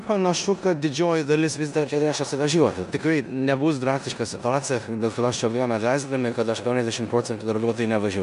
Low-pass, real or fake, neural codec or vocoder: 10.8 kHz; fake; codec, 16 kHz in and 24 kHz out, 0.9 kbps, LongCat-Audio-Codec, four codebook decoder